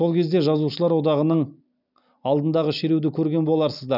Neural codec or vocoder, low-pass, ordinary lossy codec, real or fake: none; 5.4 kHz; none; real